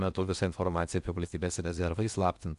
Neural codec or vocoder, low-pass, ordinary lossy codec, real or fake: codec, 16 kHz in and 24 kHz out, 0.8 kbps, FocalCodec, streaming, 65536 codes; 10.8 kHz; Opus, 64 kbps; fake